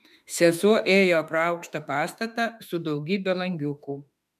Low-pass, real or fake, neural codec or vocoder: 14.4 kHz; fake; autoencoder, 48 kHz, 32 numbers a frame, DAC-VAE, trained on Japanese speech